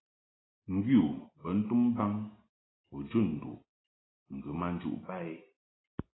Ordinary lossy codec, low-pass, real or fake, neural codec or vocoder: AAC, 16 kbps; 7.2 kHz; real; none